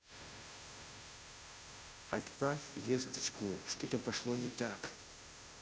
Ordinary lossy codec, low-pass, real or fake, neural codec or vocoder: none; none; fake; codec, 16 kHz, 0.5 kbps, FunCodec, trained on Chinese and English, 25 frames a second